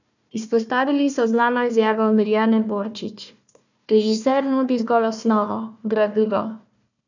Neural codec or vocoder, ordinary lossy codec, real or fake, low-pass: codec, 16 kHz, 1 kbps, FunCodec, trained on Chinese and English, 50 frames a second; none; fake; 7.2 kHz